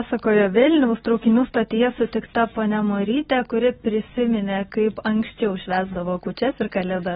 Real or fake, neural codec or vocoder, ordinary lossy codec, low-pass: real; none; AAC, 16 kbps; 7.2 kHz